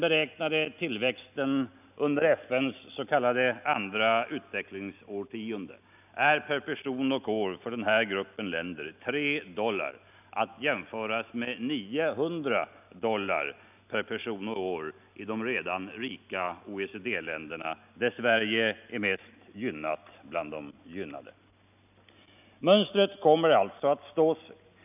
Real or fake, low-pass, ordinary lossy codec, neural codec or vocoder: real; 3.6 kHz; none; none